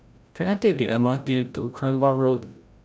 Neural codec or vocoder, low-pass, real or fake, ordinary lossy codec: codec, 16 kHz, 0.5 kbps, FreqCodec, larger model; none; fake; none